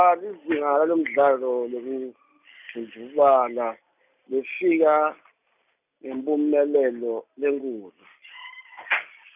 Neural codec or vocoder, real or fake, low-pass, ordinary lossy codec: none; real; 3.6 kHz; none